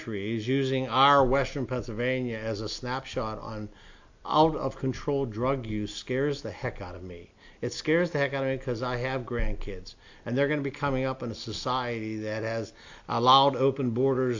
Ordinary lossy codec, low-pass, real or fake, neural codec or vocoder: AAC, 48 kbps; 7.2 kHz; real; none